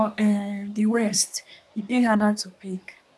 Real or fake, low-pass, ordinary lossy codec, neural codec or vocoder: fake; none; none; codec, 24 kHz, 1 kbps, SNAC